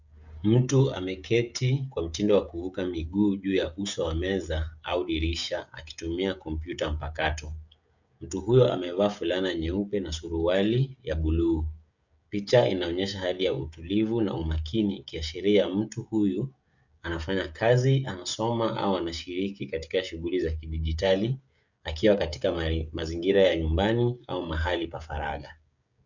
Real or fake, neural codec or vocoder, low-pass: fake; codec, 16 kHz, 16 kbps, FreqCodec, smaller model; 7.2 kHz